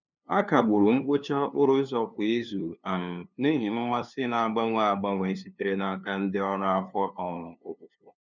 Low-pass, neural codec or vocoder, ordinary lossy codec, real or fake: 7.2 kHz; codec, 16 kHz, 2 kbps, FunCodec, trained on LibriTTS, 25 frames a second; none; fake